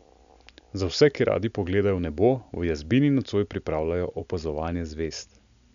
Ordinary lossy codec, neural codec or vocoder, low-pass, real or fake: none; none; 7.2 kHz; real